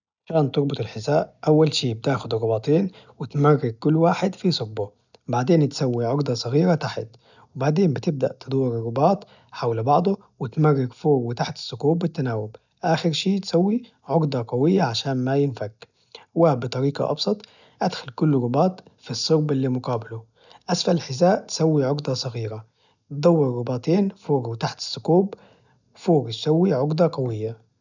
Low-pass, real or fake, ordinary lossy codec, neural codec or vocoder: 7.2 kHz; real; none; none